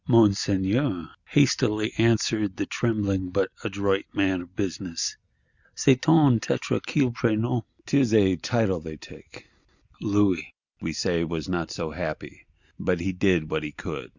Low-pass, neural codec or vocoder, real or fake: 7.2 kHz; none; real